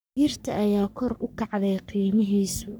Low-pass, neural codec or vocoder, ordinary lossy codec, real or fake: none; codec, 44.1 kHz, 3.4 kbps, Pupu-Codec; none; fake